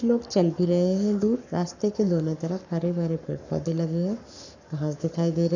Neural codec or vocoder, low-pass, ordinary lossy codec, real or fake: codec, 44.1 kHz, 7.8 kbps, Pupu-Codec; 7.2 kHz; none; fake